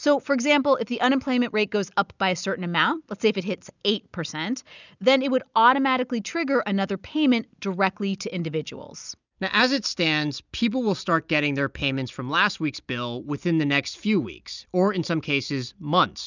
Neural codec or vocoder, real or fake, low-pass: none; real; 7.2 kHz